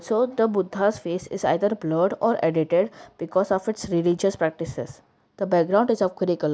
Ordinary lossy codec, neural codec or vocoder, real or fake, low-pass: none; none; real; none